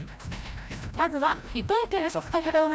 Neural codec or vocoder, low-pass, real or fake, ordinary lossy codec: codec, 16 kHz, 0.5 kbps, FreqCodec, larger model; none; fake; none